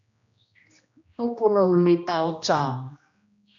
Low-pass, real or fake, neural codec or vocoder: 7.2 kHz; fake; codec, 16 kHz, 1 kbps, X-Codec, HuBERT features, trained on general audio